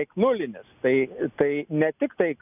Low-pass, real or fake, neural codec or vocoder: 3.6 kHz; real; none